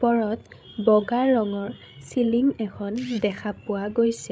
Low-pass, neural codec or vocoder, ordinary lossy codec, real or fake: none; codec, 16 kHz, 16 kbps, FreqCodec, larger model; none; fake